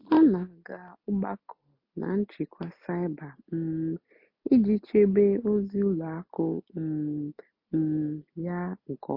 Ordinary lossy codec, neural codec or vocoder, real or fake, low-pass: MP3, 32 kbps; none; real; 5.4 kHz